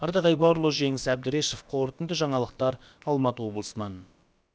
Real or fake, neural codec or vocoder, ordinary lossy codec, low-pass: fake; codec, 16 kHz, about 1 kbps, DyCAST, with the encoder's durations; none; none